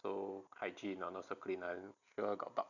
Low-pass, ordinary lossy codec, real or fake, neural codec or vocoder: 7.2 kHz; none; real; none